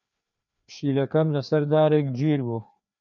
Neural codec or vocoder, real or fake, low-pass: codec, 16 kHz, 2 kbps, FreqCodec, larger model; fake; 7.2 kHz